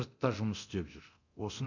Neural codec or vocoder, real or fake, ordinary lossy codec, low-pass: codec, 24 kHz, 0.9 kbps, DualCodec; fake; none; 7.2 kHz